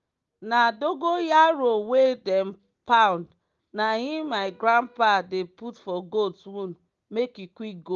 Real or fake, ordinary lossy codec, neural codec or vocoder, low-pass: real; Opus, 32 kbps; none; 7.2 kHz